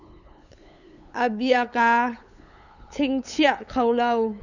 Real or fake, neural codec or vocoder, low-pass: fake; codec, 16 kHz, 8 kbps, FunCodec, trained on LibriTTS, 25 frames a second; 7.2 kHz